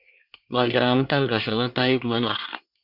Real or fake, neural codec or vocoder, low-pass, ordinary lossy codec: fake; codec, 24 kHz, 1 kbps, SNAC; 5.4 kHz; Opus, 64 kbps